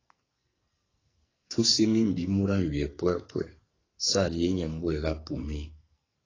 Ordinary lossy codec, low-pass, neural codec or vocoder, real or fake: AAC, 32 kbps; 7.2 kHz; codec, 44.1 kHz, 2.6 kbps, SNAC; fake